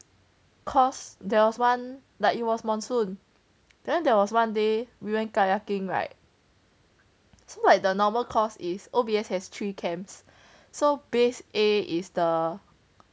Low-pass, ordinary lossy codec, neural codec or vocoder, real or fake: none; none; none; real